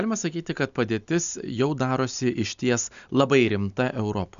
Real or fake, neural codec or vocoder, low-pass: real; none; 7.2 kHz